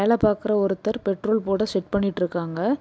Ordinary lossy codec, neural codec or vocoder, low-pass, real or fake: none; none; none; real